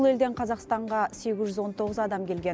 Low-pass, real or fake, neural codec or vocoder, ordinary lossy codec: none; real; none; none